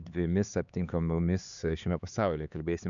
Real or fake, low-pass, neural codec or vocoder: fake; 7.2 kHz; codec, 16 kHz, 4 kbps, X-Codec, HuBERT features, trained on LibriSpeech